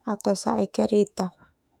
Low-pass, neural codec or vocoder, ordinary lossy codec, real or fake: 19.8 kHz; autoencoder, 48 kHz, 32 numbers a frame, DAC-VAE, trained on Japanese speech; none; fake